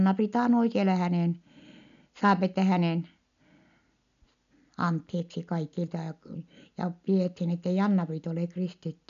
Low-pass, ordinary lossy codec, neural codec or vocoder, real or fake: 7.2 kHz; none; none; real